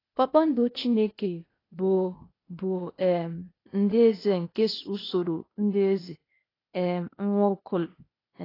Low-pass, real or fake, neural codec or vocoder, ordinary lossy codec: 5.4 kHz; fake; codec, 16 kHz, 0.8 kbps, ZipCodec; AAC, 24 kbps